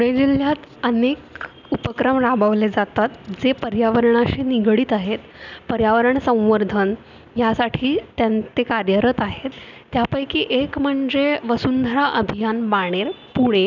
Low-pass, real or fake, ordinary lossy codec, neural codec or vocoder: 7.2 kHz; real; none; none